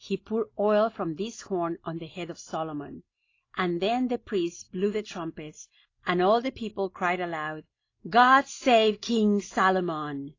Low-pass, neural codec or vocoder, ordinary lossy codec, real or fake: 7.2 kHz; none; AAC, 32 kbps; real